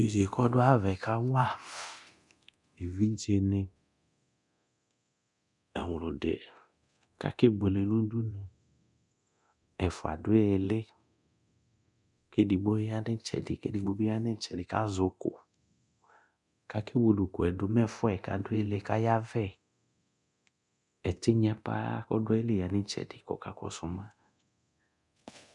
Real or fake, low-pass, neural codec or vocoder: fake; 10.8 kHz; codec, 24 kHz, 0.9 kbps, DualCodec